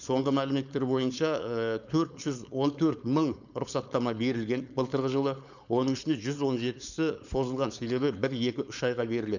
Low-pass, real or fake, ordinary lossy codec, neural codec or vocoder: 7.2 kHz; fake; none; codec, 16 kHz, 4.8 kbps, FACodec